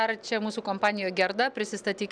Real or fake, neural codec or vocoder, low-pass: real; none; 9.9 kHz